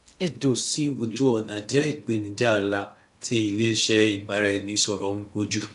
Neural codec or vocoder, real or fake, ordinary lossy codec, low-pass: codec, 16 kHz in and 24 kHz out, 0.6 kbps, FocalCodec, streaming, 2048 codes; fake; AAC, 96 kbps; 10.8 kHz